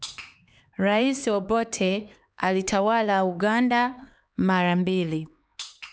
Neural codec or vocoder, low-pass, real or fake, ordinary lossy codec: codec, 16 kHz, 4 kbps, X-Codec, HuBERT features, trained on LibriSpeech; none; fake; none